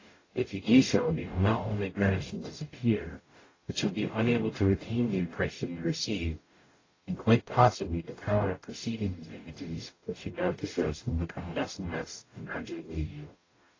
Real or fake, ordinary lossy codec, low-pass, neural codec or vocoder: fake; AAC, 32 kbps; 7.2 kHz; codec, 44.1 kHz, 0.9 kbps, DAC